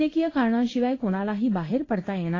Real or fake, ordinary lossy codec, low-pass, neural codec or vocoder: fake; AAC, 32 kbps; 7.2 kHz; codec, 16 kHz in and 24 kHz out, 1 kbps, XY-Tokenizer